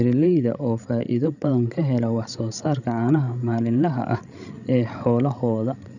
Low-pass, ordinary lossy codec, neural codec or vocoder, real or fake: 7.2 kHz; none; codec, 16 kHz, 16 kbps, FreqCodec, larger model; fake